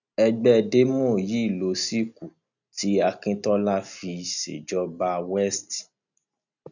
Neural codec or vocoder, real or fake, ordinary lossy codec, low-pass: none; real; none; 7.2 kHz